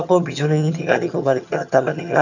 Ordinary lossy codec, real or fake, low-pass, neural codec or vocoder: none; fake; 7.2 kHz; vocoder, 22.05 kHz, 80 mel bands, HiFi-GAN